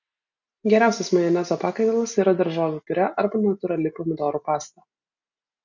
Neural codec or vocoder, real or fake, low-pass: none; real; 7.2 kHz